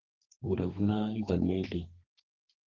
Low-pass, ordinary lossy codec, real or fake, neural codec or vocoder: 7.2 kHz; Opus, 16 kbps; fake; codec, 32 kHz, 1.9 kbps, SNAC